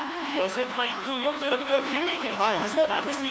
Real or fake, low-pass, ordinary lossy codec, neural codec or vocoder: fake; none; none; codec, 16 kHz, 1 kbps, FunCodec, trained on LibriTTS, 50 frames a second